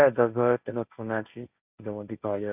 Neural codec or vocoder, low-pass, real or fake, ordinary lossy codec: codec, 16 kHz, 1.1 kbps, Voila-Tokenizer; 3.6 kHz; fake; none